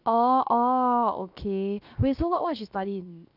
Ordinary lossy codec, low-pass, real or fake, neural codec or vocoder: none; 5.4 kHz; fake; codec, 16 kHz, 0.7 kbps, FocalCodec